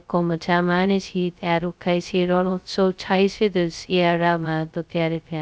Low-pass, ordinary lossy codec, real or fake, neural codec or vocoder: none; none; fake; codec, 16 kHz, 0.2 kbps, FocalCodec